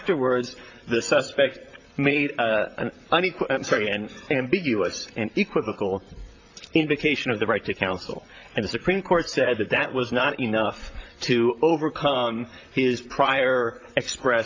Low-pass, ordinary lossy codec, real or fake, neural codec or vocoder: 7.2 kHz; AAC, 48 kbps; fake; vocoder, 44.1 kHz, 128 mel bands, Pupu-Vocoder